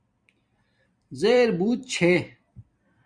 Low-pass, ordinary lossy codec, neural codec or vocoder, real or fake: 9.9 kHz; Opus, 64 kbps; vocoder, 44.1 kHz, 128 mel bands every 256 samples, BigVGAN v2; fake